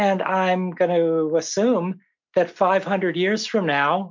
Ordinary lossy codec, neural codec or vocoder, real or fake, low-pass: MP3, 64 kbps; none; real; 7.2 kHz